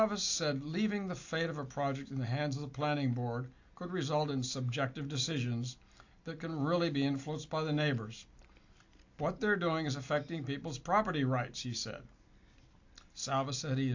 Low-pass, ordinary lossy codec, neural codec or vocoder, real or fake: 7.2 kHz; AAC, 48 kbps; none; real